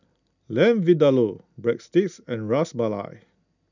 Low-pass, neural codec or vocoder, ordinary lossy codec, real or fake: 7.2 kHz; none; none; real